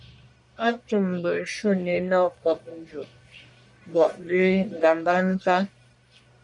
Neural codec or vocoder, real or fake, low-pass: codec, 44.1 kHz, 1.7 kbps, Pupu-Codec; fake; 10.8 kHz